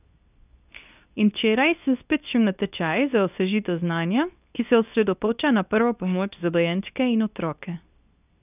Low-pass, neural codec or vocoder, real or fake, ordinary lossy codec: 3.6 kHz; codec, 24 kHz, 0.9 kbps, WavTokenizer, medium speech release version 2; fake; none